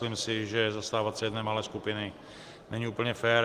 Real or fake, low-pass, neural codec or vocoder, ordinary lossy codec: real; 14.4 kHz; none; Opus, 24 kbps